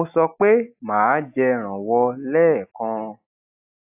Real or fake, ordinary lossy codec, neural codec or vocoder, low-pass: real; AAC, 32 kbps; none; 3.6 kHz